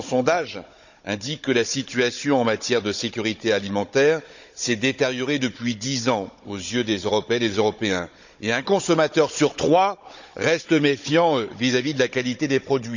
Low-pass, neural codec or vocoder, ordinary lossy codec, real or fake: 7.2 kHz; codec, 16 kHz, 16 kbps, FunCodec, trained on Chinese and English, 50 frames a second; none; fake